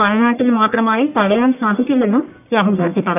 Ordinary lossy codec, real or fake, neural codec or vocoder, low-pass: none; fake; codec, 44.1 kHz, 1.7 kbps, Pupu-Codec; 3.6 kHz